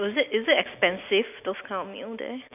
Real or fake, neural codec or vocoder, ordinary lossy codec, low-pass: real; none; none; 3.6 kHz